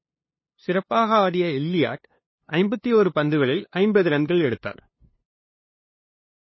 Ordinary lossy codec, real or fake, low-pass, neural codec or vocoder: MP3, 24 kbps; fake; 7.2 kHz; codec, 16 kHz, 2 kbps, FunCodec, trained on LibriTTS, 25 frames a second